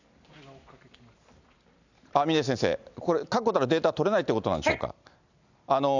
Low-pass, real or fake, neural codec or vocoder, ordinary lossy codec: 7.2 kHz; real; none; none